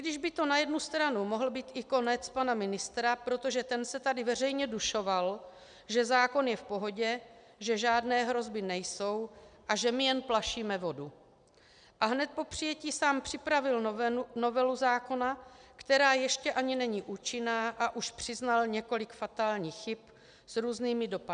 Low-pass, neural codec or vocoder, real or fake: 9.9 kHz; none; real